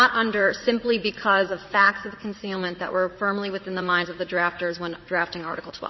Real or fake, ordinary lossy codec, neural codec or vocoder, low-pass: fake; MP3, 24 kbps; vocoder, 44.1 kHz, 80 mel bands, Vocos; 7.2 kHz